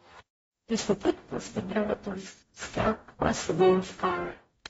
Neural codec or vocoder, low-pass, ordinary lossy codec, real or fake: codec, 44.1 kHz, 0.9 kbps, DAC; 19.8 kHz; AAC, 24 kbps; fake